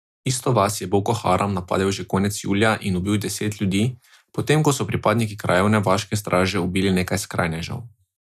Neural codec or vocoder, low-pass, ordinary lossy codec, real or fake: none; 14.4 kHz; none; real